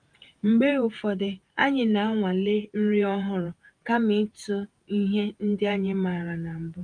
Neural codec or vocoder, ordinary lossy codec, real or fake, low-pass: vocoder, 48 kHz, 128 mel bands, Vocos; Opus, 32 kbps; fake; 9.9 kHz